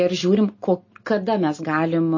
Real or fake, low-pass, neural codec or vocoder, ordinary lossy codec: real; 7.2 kHz; none; MP3, 32 kbps